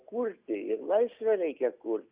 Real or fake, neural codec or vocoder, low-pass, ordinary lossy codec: real; none; 3.6 kHz; Opus, 64 kbps